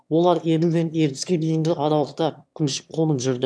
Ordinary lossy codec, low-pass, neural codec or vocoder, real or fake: none; none; autoencoder, 22.05 kHz, a latent of 192 numbers a frame, VITS, trained on one speaker; fake